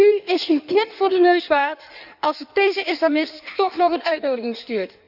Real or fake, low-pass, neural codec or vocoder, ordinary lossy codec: fake; 5.4 kHz; codec, 16 kHz in and 24 kHz out, 1.1 kbps, FireRedTTS-2 codec; none